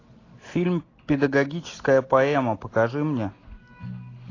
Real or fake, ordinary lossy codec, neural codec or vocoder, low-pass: real; AAC, 32 kbps; none; 7.2 kHz